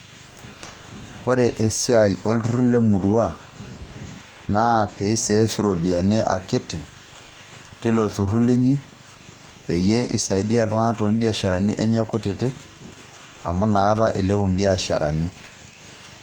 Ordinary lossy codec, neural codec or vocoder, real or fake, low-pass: Opus, 64 kbps; codec, 44.1 kHz, 2.6 kbps, DAC; fake; 19.8 kHz